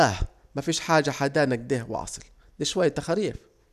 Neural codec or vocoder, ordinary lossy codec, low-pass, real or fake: none; none; 14.4 kHz; real